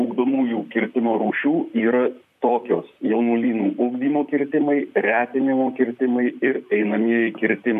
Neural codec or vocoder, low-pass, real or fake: vocoder, 44.1 kHz, 128 mel bands, Pupu-Vocoder; 14.4 kHz; fake